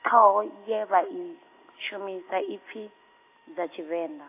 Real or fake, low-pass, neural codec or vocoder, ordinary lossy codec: real; 3.6 kHz; none; AAC, 24 kbps